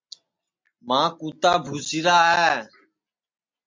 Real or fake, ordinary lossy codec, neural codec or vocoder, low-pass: real; MP3, 64 kbps; none; 7.2 kHz